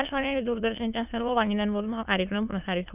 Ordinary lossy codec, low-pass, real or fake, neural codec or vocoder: none; 3.6 kHz; fake; autoencoder, 22.05 kHz, a latent of 192 numbers a frame, VITS, trained on many speakers